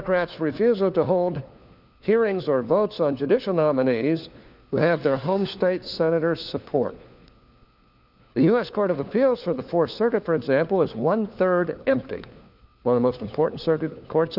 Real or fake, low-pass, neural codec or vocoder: fake; 5.4 kHz; codec, 16 kHz, 4 kbps, FunCodec, trained on LibriTTS, 50 frames a second